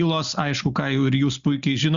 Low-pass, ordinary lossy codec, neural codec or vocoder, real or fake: 7.2 kHz; Opus, 64 kbps; none; real